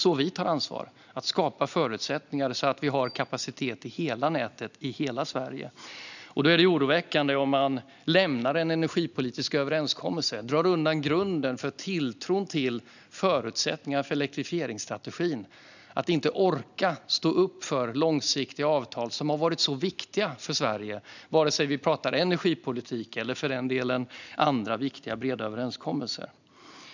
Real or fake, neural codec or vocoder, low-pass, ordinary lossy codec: real; none; 7.2 kHz; none